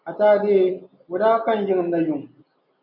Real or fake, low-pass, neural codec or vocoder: real; 5.4 kHz; none